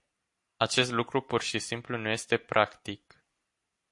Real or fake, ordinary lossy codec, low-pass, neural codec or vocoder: fake; MP3, 48 kbps; 10.8 kHz; vocoder, 44.1 kHz, 128 mel bands every 256 samples, BigVGAN v2